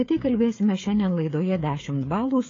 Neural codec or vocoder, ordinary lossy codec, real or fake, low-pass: codec, 16 kHz, 16 kbps, FreqCodec, smaller model; AAC, 32 kbps; fake; 7.2 kHz